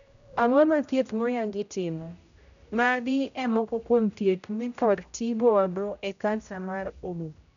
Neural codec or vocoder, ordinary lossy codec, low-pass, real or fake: codec, 16 kHz, 0.5 kbps, X-Codec, HuBERT features, trained on general audio; none; 7.2 kHz; fake